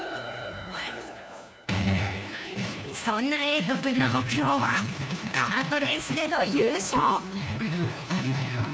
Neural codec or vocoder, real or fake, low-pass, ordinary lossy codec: codec, 16 kHz, 1 kbps, FunCodec, trained on LibriTTS, 50 frames a second; fake; none; none